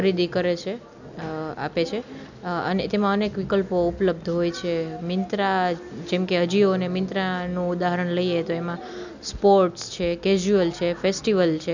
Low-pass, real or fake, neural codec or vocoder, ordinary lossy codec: 7.2 kHz; real; none; none